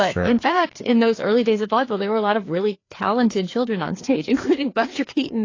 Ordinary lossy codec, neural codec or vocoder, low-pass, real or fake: AAC, 32 kbps; codec, 16 kHz, 2 kbps, FreqCodec, larger model; 7.2 kHz; fake